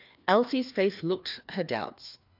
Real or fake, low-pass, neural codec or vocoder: fake; 5.4 kHz; codec, 16 kHz, 2 kbps, FreqCodec, larger model